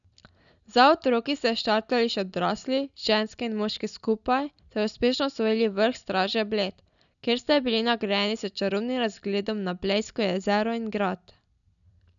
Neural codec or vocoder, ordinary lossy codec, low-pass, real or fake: none; none; 7.2 kHz; real